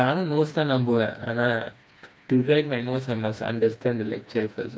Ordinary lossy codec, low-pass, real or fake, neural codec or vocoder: none; none; fake; codec, 16 kHz, 2 kbps, FreqCodec, smaller model